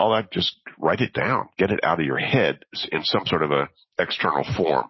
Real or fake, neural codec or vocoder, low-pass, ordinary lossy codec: real; none; 7.2 kHz; MP3, 24 kbps